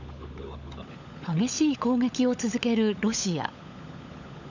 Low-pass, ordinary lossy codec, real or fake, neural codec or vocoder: 7.2 kHz; none; fake; codec, 16 kHz, 16 kbps, FunCodec, trained on LibriTTS, 50 frames a second